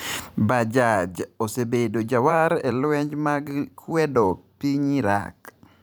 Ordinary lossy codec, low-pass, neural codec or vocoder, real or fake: none; none; vocoder, 44.1 kHz, 128 mel bands every 512 samples, BigVGAN v2; fake